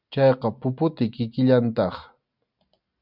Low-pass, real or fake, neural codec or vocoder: 5.4 kHz; real; none